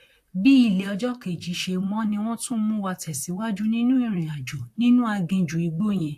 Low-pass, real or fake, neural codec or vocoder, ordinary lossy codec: 14.4 kHz; fake; vocoder, 44.1 kHz, 128 mel bands, Pupu-Vocoder; AAC, 64 kbps